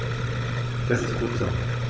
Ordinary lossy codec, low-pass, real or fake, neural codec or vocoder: none; none; fake; codec, 16 kHz, 16 kbps, FunCodec, trained on Chinese and English, 50 frames a second